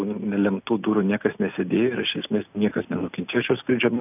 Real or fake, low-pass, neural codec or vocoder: real; 3.6 kHz; none